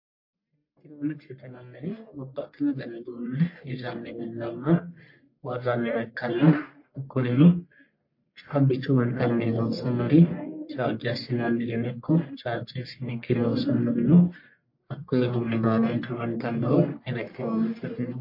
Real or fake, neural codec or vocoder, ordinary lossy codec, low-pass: fake; codec, 44.1 kHz, 1.7 kbps, Pupu-Codec; MP3, 32 kbps; 5.4 kHz